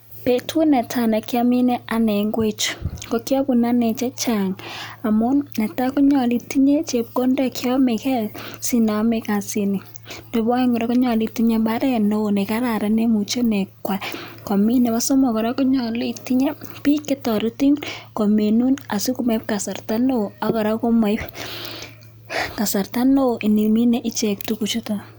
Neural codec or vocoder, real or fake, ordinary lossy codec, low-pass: none; real; none; none